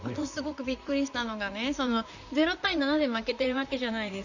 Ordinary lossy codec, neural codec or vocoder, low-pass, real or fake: none; vocoder, 44.1 kHz, 128 mel bands, Pupu-Vocoder; 7.2 kHz; fake